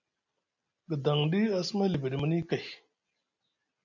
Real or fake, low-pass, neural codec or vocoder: real; 7.2 kHz; none